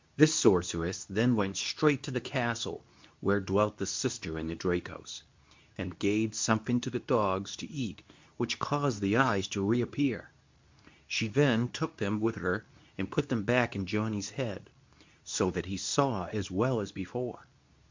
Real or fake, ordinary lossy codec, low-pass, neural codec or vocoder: fake; MP3, 64 kbps; 7.2 kHz; codec, 24 kHz, 0.9 kbps, WavTokenizer, medium speech release version 2